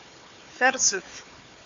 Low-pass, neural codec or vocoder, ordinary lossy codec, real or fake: 7.2 kHz; codec, 16 kHz, 4 kbps, FunCodec, trained on Chinese and English, 50 frames a second; AAC, 64 kbps; fake